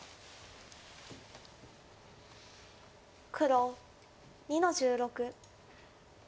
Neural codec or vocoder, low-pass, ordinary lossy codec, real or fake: none; none; none; real